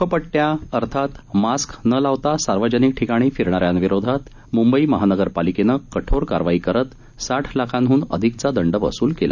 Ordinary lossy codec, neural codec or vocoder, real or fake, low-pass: none; none; real; 7.2 kHz